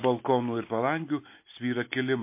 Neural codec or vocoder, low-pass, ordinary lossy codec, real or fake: none; 3.6 kHz; MP3, 24 kbps; real